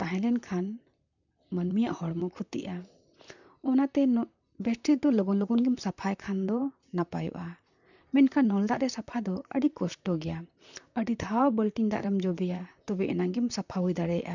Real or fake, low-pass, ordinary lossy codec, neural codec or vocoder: fake; 7.2 kHz; none; vocoder, 44.1 kHz, 128 mel bands, Pupu-Vocoder